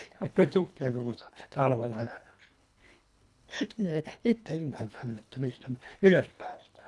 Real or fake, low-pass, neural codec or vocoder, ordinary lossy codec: fake; none; codec, 24 kHz, 1.5 kbps, HILCodec; none